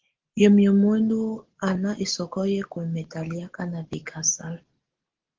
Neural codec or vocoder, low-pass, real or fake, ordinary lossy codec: none; 7.2 kHz; real; Opus, 16 kbps